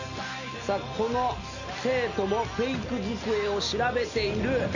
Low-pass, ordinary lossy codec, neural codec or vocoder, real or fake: 7.2 kHz; none; none; real